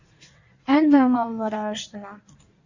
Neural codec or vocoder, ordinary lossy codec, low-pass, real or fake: codec, 16 kHz in and 24 kHz out, 1.1 kbps, FireRedTTS-2 codec; Opus, 64 kbps; 7.2 kHz; fake